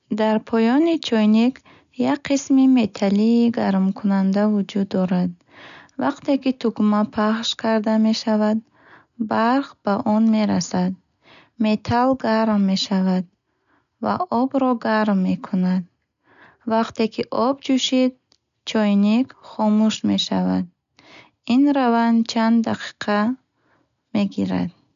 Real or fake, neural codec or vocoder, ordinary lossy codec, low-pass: real; none; none; 7.2 kHz